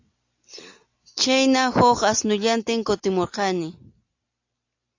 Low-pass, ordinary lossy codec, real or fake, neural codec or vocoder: 7.2 kHz; AAC, 48 kbps; real; none